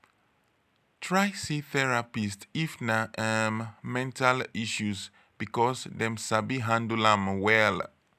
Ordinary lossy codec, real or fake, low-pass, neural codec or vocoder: none; real; 14.4 kHz; none